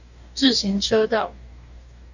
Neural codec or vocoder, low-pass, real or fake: codec, 44.1 kHz, 2.6 kbps, DAC; 7.2 kHz; fake